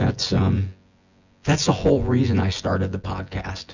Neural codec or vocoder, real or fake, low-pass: vocoder, 24 kHz, 100 mel bands, Vocos; fake; 7.2 kHz